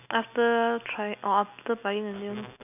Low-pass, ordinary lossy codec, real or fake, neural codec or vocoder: 3.6 kHz; none; real; none